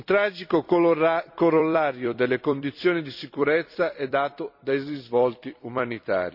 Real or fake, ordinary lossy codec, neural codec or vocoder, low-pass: fake; none; vocoder, 44.1 kHz, 128 mel bands every 256 samples, BigVGAN v2; 5.4 kHz